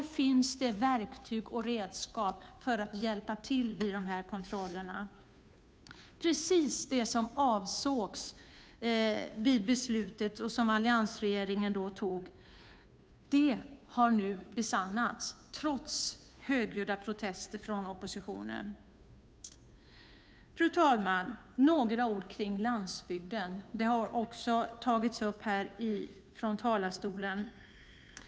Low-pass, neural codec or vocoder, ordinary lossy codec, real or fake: none; codec, 16 kHz, 2 kbps, FunCodec, trained on Chinese and English, 25 frames a second; none; fake